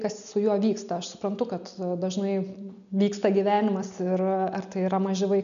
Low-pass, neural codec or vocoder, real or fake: 7.2 kHz; none; real